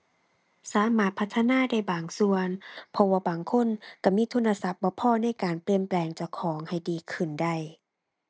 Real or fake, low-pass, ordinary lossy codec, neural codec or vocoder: real; none; none; none